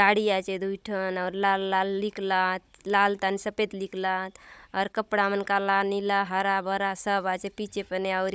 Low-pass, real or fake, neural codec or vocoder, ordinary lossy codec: none; fake; codec, 16 kHz, 16 kbps, FunCodec, trained on Chinese and English, 50 frames a second; none